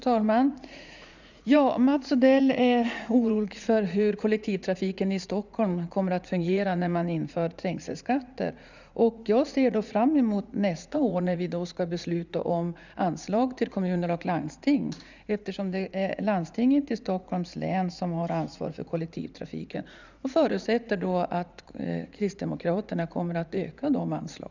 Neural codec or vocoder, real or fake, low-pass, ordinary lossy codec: vocoder, 44.1 kHz, 80 mel bands, Vocos; fake; 7.2 kHz; none